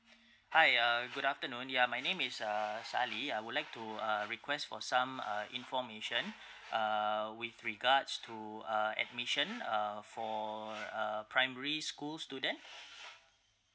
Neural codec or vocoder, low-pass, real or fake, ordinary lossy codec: none; none; real; none